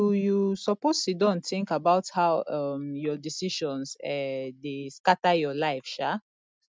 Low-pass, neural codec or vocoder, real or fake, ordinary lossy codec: none; none; real; none